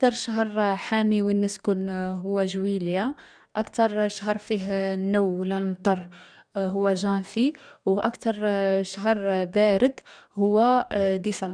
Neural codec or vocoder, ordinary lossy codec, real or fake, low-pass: codec, 44.1 kHz, 2.6 kbps, DAC; none; fake; 9.9 kHz